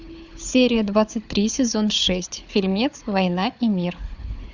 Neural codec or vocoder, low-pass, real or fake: codec, 16 kHz, 4 kbps, FunCodec, trained on Chinese and English, 50 frames a second; 7.2 kHz; fake